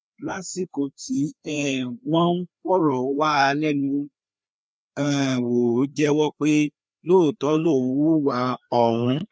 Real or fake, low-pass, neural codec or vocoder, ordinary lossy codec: fake; none; codec, 16 kHz, 2 kbps, FreqCodec, larger model; none